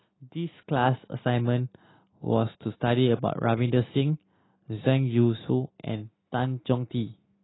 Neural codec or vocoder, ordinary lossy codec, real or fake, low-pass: none; AAC, 16 kbps; real; 7.2 kHz